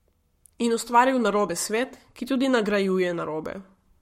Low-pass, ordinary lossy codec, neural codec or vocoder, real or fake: 19.8 kHz; MP3, 64 kbps; codec, 44.1 kHz, 7.8 kbps, Pupu-Codec; fake